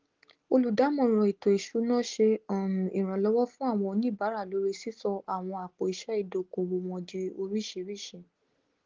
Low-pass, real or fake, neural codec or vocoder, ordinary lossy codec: 7.2 kHz; real; none; Opus, 16 kbps